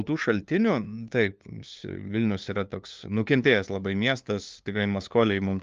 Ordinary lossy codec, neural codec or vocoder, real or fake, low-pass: Opus, 24 kbps; codec, 16 kHz, 2 kbps, FunCodec, trained on LibriTTS, 25 frames a second; fake; 7.2 kHz